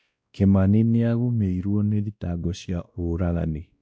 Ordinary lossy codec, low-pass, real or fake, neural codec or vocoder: none; none; fake; codec, 16 kHz, 1 kbps, X-Codec, WavLM features, trained on Multilingual LibriSpeech